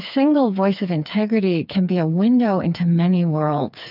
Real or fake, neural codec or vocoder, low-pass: fake; codec, 16 kHz, 4 kbps, FreqCodec, smaller model; 5.4 kHz